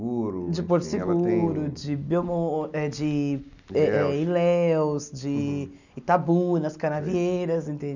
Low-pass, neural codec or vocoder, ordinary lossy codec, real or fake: 7.2 kHz; none; none; real